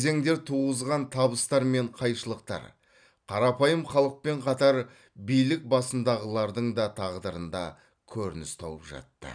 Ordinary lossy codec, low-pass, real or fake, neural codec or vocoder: none; 9.9 kHz; real; none